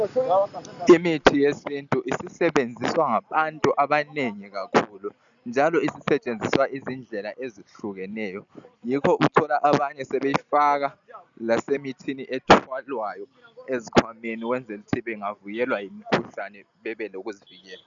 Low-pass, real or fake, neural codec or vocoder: 7.2 kHz; real; none